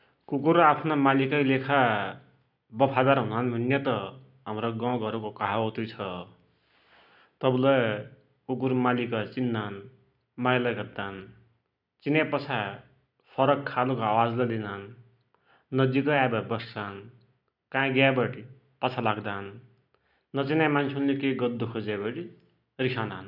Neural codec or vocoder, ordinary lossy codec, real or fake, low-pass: none; none; real; 5.4 kHz